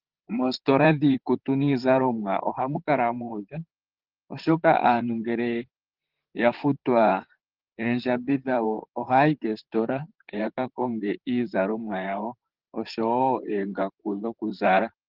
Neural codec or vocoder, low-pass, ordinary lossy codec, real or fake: vocoder, 44.1 kHz, 128 mel bands, Pupu-Vocoder; 5.4 kHz; Opus, 16 kbps; fake